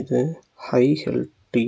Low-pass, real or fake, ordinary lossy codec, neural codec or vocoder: none; real; none; none